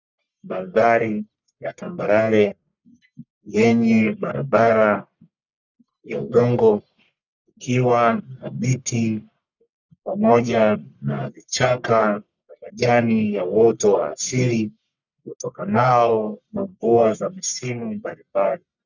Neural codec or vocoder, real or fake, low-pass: codec, 44.1 kHz, 1.7 kbps, Pupu-Codec; fake; 7.2 kHz